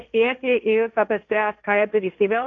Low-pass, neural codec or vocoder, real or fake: 7.2 kHz; codec, 16 kHz, 1.1 kbps, Voila-Tokenizer; fake